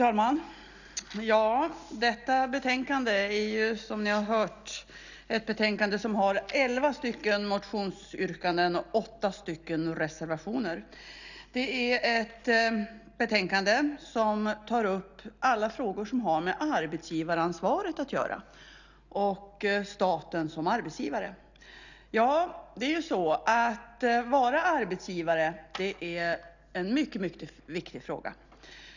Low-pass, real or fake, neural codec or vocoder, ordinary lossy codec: 7.2 kHz; real; none; none